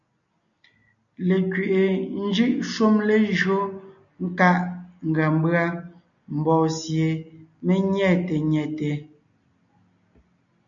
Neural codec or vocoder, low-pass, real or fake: none; 7.2 kHz; real